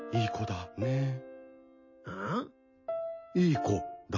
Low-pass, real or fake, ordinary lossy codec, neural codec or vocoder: 7.2 kHz; real; MP3, 32 kbps; none